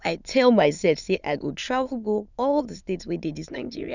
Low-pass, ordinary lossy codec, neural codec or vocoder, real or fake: 7.2 kHz; none; autoencoder, 22.05 kHz, a latent of 192 numbers a frame, VITS, trained on many speakers; fake